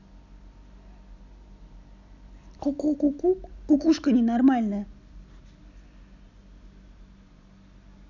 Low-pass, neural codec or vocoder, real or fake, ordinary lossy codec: 7.2 kHz; none; real; none